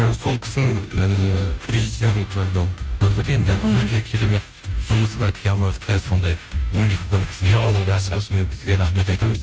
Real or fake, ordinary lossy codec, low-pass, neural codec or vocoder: fake; none; none; codec, 16 kHz, 0.5 kbps, FunCodec, trained on Chinese and English, 25 frames a second